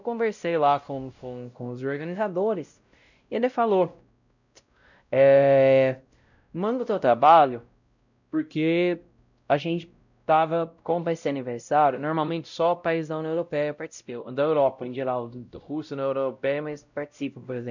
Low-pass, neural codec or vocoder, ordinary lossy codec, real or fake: 7.2 kHz; codec, 16 kHz, 0.5 kbps, X-Codec, WavLM features, trained on Multilingual LibriSpeech; none; fake